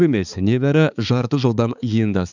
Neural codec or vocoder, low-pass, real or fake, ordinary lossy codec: codec, 16 kHz, 4 kbps, X-Codec, HuBERT features, trained on balanced general audio; 7.2 kHz; fake; none